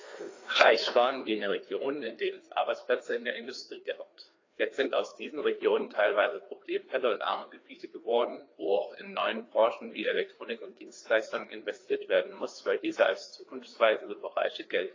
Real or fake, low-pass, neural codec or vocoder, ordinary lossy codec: fake; 7.2 kHz; codec, 16 kHz, 2 kbps, FreqCodec, larger model; AAC, 32 kbps